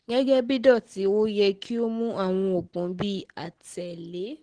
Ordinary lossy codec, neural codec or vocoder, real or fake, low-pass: Opus, 16 kbps; none; real; 9.9 kHz